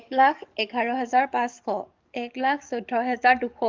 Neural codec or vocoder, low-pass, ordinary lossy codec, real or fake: codec, 44.1 kHz, 7.8 kbps, DAC; 7.2 kHz; Opus, 24 kbps; fake